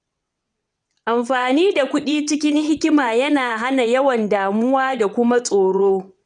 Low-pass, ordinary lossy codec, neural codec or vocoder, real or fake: 9.9 kHz; none; vocoder, 22.05 kHz, 80 mel bands, WaveNeXt; fake